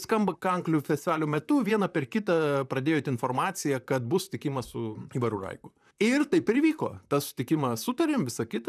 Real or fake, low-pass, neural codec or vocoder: fake; 14.4 kHz; vocoder, 44.1 kHz, 128 mel bands, Pupu-Vocoder